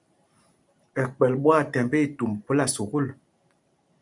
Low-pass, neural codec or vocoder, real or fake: 10.8 kHz; vocoder, 44.1 kHz, 128 mel bands every 512 samples, BigVGAN v2; fake